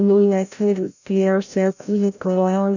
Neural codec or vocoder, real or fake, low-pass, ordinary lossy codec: codec, 16 kHz, 0.5 kbps, FreqCodec, larger model; fake; 7.2 kHz; none